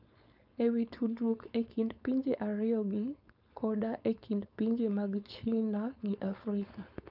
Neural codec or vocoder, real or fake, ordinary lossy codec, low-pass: codec, 16 kHz, 4.8 kbps, FACodec; fake; none; 5.4 kHz